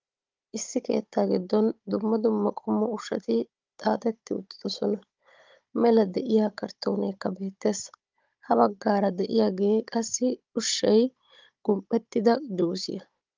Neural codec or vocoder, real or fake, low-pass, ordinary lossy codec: codec, 16 kHz, 16 kbps, FunCodec, trained on Chinese and English, 50 frames a second; fake; 7.2 kHz; Opus, 24 kbps